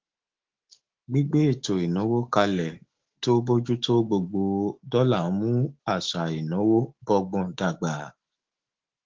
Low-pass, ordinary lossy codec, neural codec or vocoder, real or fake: 7.2 kHz; Opus, 16 kbps; autoencoder, 48 kHz, 128 numbers a frame, DAC-VAE, trained on Japanese speech; fake